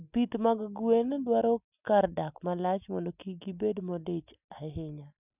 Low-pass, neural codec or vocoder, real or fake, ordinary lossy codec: 3.6 kHz; none; real; none